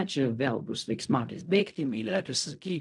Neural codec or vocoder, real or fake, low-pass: codec, 16 kHz in and 24 kHz out, 0.4 kbps, LongCat-Audio-Codec, fine tuned four codebook decoder; fake; 10.8 kHz